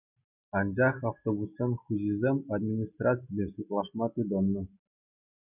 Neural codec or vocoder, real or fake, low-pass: none; real; 3.6 kHz